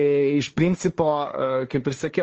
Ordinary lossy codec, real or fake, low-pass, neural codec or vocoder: Opus, 24 kbps; fake; 7.2 kHz; codec, 16 kHz, 1.1 kbps, Voila-Tokenizer